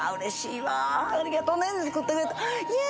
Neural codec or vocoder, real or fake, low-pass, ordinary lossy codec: none; real; none; none